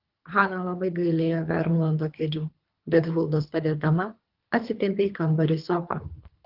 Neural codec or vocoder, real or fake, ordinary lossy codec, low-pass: codec, 24 kHz, 3 kbps, HILCodec; fake; Opus, 32 kbps; 5.4 kHz